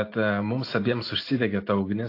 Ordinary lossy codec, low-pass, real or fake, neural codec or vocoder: AAC, 32 kbps; 5.4 kHz; real; none